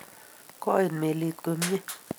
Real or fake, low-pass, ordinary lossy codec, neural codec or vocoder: real; none; none; none